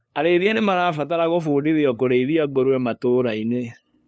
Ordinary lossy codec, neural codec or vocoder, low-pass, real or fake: none; codec, 16 kHz, 2 kbps, FunCodec, trained on LibriTTS, 25 frames a second; none; fake